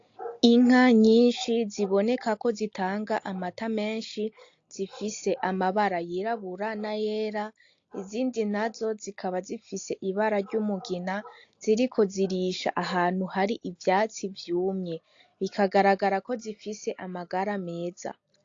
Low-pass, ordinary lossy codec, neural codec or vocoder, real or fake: 7.2 kHz; AAC, 48 kbps; none; real